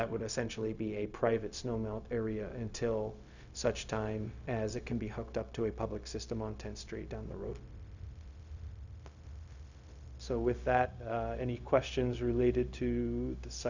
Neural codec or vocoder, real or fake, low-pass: codec, 16 kHz, 0.4 kbps, LongCat-Audio-Codec; fake; 7.2 kHz